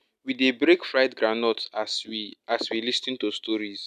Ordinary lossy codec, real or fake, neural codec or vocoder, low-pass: none; real; none; 14.4 kHz